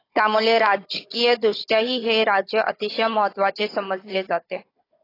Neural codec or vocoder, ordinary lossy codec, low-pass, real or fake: codec, 16 kHz, 16 kbps, FunCodec, trained on Chinese and English, 50 frames a second; AAC, 24 kbps; 5.4 kHz; fake